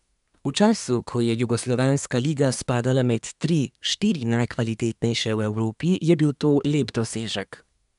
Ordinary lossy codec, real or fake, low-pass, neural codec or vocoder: none; fake; 10.8 kHz; codec, 24 kHz, 1 kbps, SNAC